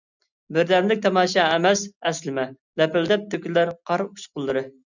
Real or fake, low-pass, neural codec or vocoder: real; 7.2 kHz; none